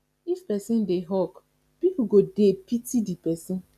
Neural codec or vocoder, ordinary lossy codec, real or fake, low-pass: none; none; real; 14.4 kHz